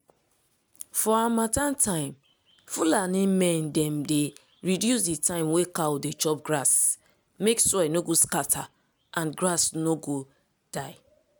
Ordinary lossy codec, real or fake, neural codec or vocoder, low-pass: none; real; none; none